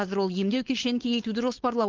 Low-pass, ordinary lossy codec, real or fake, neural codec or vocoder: 7.2 kHz; Opus, 16 kbps; fake; codec, 16 kHz, 4.8 kbps, FACodec